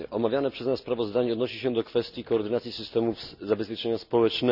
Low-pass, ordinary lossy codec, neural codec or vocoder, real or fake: 5.4 kHz; none; none; real